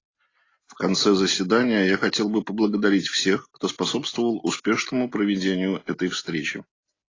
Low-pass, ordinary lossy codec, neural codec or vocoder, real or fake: 7.2 kHz; AAC, 32 kbps; none; real